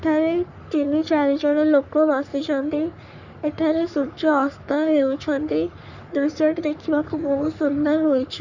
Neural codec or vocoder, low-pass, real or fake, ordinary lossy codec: codec, 44.1 kHz, 3.4 kbps, Pupu-Codec; 7.2 kHz; fake; none